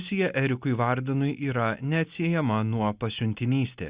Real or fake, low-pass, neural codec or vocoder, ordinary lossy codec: real; 3.6 kHz; none; Opus, 64 kbps